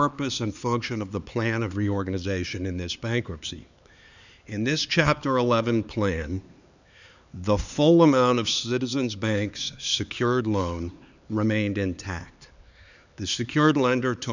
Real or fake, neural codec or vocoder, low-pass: fake; codec, 16 kHz, 4 kbps, X-Codec, HuBERT features, trained on LibriSpeech; 7.2 kHz